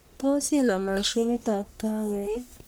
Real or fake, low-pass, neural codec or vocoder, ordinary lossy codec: fake; none; codec, 44.1 kHz, 1.7 kbps, Pupu-Codec; none